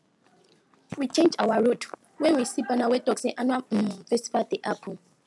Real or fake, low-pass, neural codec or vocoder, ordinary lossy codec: real; none; none; none